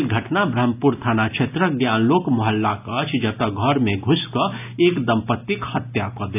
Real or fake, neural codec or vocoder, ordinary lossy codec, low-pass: real; none; AAC, 32 kbps; 3.6 kHz